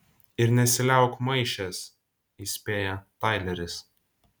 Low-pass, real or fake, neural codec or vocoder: 19.8 kHz; real; none